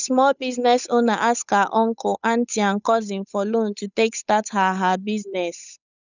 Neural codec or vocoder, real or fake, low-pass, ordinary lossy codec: codec, 16 kHz, 8 kbps, FunCodec, trained on Chinese and English, 25 frames a second; fake; 7.2 kHz; none